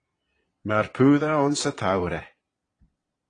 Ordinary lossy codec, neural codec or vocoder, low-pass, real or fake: AAC, 32 kbps; vocoder, 22.05 kHz, 80 mel bands, Vocos; 9.9 kHz; fake